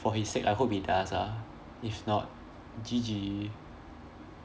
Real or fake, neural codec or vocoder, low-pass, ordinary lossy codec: real; none; none; none